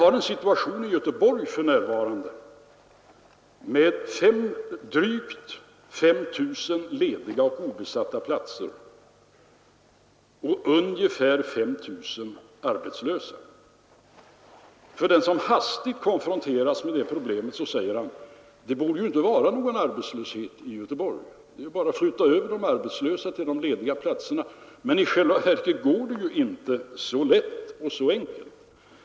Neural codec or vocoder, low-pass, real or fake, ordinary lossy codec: none; none; real; none